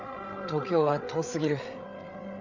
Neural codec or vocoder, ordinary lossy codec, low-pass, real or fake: codec, 16 kHz, 8 kbps, FreqCodec, larger model; none; 7.2 kHz; fake